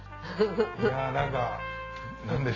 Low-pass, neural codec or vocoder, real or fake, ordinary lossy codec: 7.2 kHz; none; real; AAC, 32 kbps